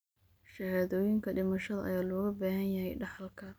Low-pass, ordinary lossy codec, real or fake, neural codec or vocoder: none; none; real; none